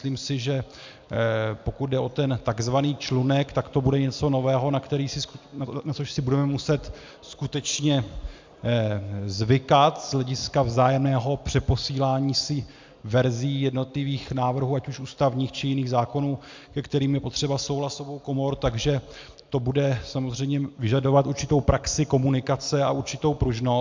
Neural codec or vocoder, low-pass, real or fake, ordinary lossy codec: none; 7.2 kHz; real; MP3, 64 kbps